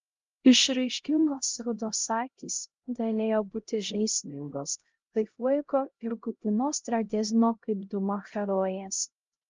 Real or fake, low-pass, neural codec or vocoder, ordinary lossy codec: fake; 7.2 kHz; codec, 16 kHz, 0.5 kbps, X-Codec, HuBERT features, trained on LibriSpeech; Opus, 32 kbps